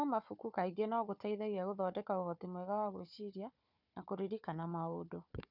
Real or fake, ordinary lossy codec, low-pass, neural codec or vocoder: fake; none; 5.4 kHz; codec, 16 kHz, 4 kbps, FunCodec, trained on Chinese and English, 50 frames a second